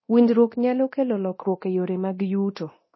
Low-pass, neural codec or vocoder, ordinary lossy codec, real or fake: 7.2 kHz; codec, 24 kHz, 0.9 kbps, DualCodec; MP3, 24 kbps; fake